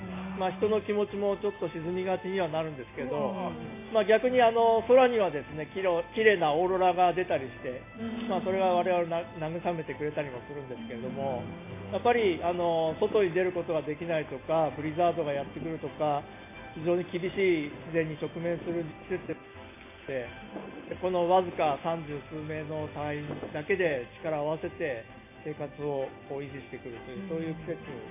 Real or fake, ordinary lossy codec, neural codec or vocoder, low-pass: real; AAC, 24 kbps; none; 3.6 kHz